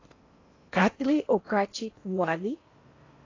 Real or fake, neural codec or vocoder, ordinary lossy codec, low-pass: fake; codec, 16 kHz in and 24 kHz out, 0.8 kbps, FocalCodec, streaming, 65536 codes; AAC, 32 kbps; 7.2 kHz